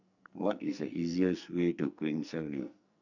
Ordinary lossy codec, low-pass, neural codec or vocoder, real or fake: none; 7.2 kHz; codec, 44.1 kHz, 2.6 kbps, SNAC; fake